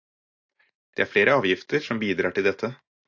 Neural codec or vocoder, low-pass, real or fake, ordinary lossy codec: none; 7.2 kHz; real; AAC, 48 kbps